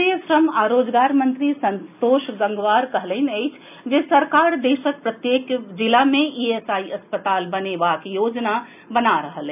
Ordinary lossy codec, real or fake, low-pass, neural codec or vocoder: none; real; 3.6 kHz; none